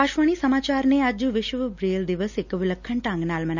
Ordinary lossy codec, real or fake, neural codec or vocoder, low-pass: none; real; none; 7.2 kHz